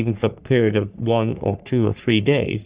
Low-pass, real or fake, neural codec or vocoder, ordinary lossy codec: 3.6 kHz; fake; codec, 44.1 kHz, 3.4 kbps, Pupu-Codec; Opus, 64 kbps